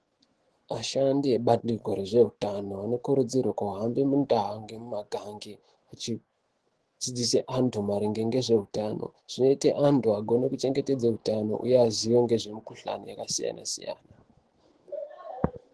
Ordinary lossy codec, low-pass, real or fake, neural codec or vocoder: Opus, 16 kbps; 10.8 kHz; fake; autoencoder, 48 kHz, 128 numbers a frame, DAC-VAE, trained on Japanese speech